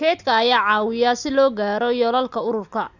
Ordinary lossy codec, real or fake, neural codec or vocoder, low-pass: none; real; none; 7.2 kHz